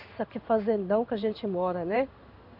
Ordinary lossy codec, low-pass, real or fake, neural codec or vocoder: none; 5.4 kHz; fake; codec, 16 kHz in and 24 kHz out, 1 kbps, XY-Tokenizer